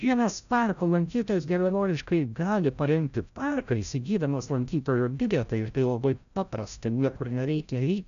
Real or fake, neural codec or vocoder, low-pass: fake; codec, 16 kHz, 0.5 kbps, FreqCodec, larger model; 7.2 kHz